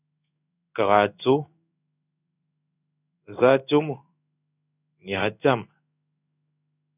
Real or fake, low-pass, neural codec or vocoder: fake; 3.6 kHz; codec, 16 kHz in and 24 kHz out, 1 kbps, XY-Tokenizer